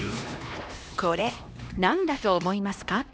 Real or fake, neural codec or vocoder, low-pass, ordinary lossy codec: fake; codec, 16 kHz, 1 kbps, X-Codec, HuBERT features, trained on LibriSpeech; none; none